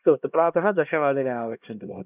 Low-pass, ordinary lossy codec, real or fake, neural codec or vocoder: 3.6 kHz; none; fake; codec, 16 kHz, 1 kbps, X-Codec, HuBERT features, trained on LibriSpeech